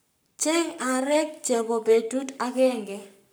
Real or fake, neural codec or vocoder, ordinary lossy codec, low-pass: fake; codec, 44.1 kHz, 7.8 kbps, Pupu-Codec; none; none